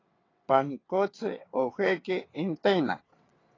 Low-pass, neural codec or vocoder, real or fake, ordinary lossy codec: 7.2 kHz; vocoder, 22.05 kHz, 80 mel bands, WaveNeXt; fake; AAC, 32 kbps